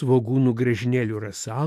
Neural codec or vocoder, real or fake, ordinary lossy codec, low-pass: autoencoder, 48 kHz, 128 numbers a frame, DAC-VAE, trained on Japanese speech; fake; AAC, 64 kbps; 14.4 kHz